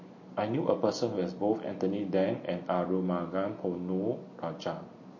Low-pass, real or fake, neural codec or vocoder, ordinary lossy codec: 7.2 kHz; real; none; MP3, 32 kbps